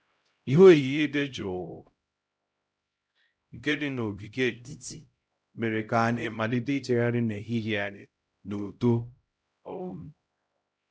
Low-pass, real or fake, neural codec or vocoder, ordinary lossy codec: none; fake; codec, 16 kHz, 0.5 kbps, X-Codec, HuBERT features, trained on LibriSpeech; none